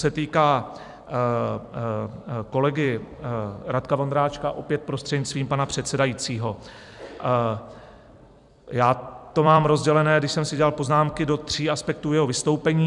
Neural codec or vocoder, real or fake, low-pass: vocoder, 44.1 kHz, 128 mel bands every 256 samples, BigVGAN v2; fake; 10.8 kHz